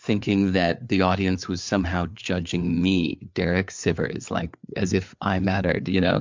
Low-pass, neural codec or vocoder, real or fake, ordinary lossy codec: 7.2 kHz; codec, 16 kHz, 4 kbps, FreqCodec, larger model; fake; MP3, 64 kbps